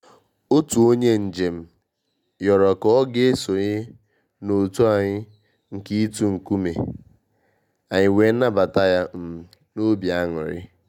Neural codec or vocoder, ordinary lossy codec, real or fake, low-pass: none; none; real; 19.8 kHz